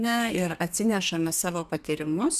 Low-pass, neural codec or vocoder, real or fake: 14.4 kHz; codec, 32 kHz, 1.9 kbps, SNAC; fake